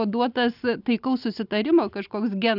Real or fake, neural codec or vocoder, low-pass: real; none; 5.4 kHz